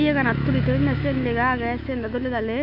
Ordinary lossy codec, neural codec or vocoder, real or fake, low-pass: none; autoencoder, 48 kHz, 128 numbers a frame, DAC-VAE, trained on Japanese speech; fake; 5.4 kHz